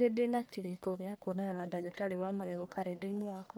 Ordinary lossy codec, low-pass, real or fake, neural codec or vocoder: none; none; fake; codec, 44.1 kHz, 1.7 kbps, Pupu-Codec